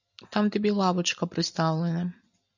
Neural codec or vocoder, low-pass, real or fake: none; 7.2 kHz; real